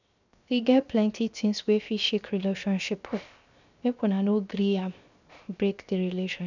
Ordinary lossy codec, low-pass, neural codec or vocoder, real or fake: none; 7.2 kHz; codec, 16 kHz, 0.7 kbps, FocalCodec; fake